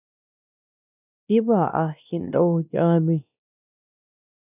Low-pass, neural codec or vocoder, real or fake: 3.6 kHz; codec, 16 kHz, 1 kbps, X-Codec, WavLM features, trained on Multilingual LibriSpeech; fake